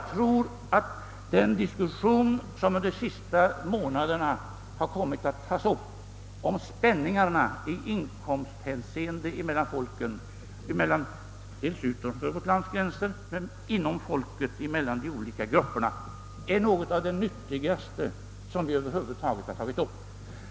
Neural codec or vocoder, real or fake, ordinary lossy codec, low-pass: none; real; none; none